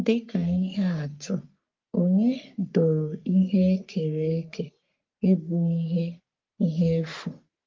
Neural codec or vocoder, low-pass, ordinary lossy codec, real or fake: codec, 44.1 kHz, 3.4 kbps, Pupu-Codec; 7.2 kHz; Opus, 32 kbps; fake